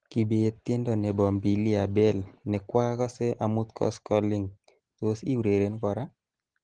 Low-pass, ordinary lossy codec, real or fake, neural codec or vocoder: 9.9 kHz; Opus, 16 kbps; real; none